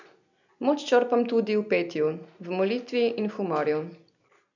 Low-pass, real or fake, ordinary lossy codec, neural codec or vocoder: 7.2 kHz; real; none; none